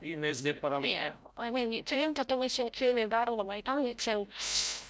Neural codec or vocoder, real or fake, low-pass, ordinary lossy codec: codec, 16 kHz, 0.5 kbps, FreqCodec, larger model; fake; none; none